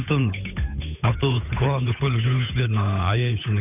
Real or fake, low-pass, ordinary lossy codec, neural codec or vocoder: fake; 3.6 kHz; none; codec, 16 kHz, 8 kbps, FunCodec, trained on Chinese and English, 25 frames a second